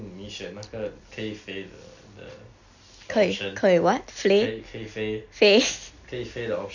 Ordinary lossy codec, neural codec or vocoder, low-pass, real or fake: none; none; 7.2 kHz; real